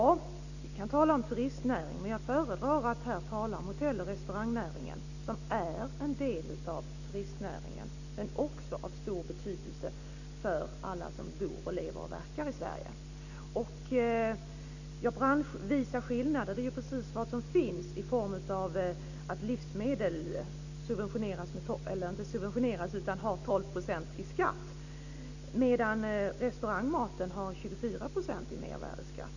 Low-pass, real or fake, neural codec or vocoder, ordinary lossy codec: 7.2 kHz; real; none; none